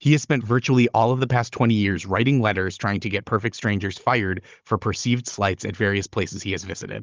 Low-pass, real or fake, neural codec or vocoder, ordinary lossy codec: 7.2 kHz; fake; codec, 16 kHz, 16 kbps, FunCodec, trained on Chinese and English, 50 frames a second; Opus, 32 kbps